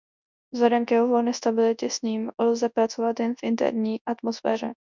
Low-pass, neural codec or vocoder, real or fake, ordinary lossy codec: 7.2 kHz; codec, 24 kHz, 0.9 kbps, WavTokenizer, large speech release; fake; MP3, 64 kbps